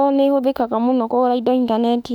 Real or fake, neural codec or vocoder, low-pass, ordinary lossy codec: fake; autoencoder, 48 kHz, 32 numbers a frame, DAC-VAE, trained on Japanese speech; 19.8 kHz; none